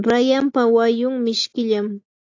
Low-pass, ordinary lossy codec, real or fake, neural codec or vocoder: 7.2 kHz; AAC, 48 kbps; real; none